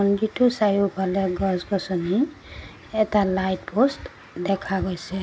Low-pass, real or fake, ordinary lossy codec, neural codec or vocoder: none; real; none; none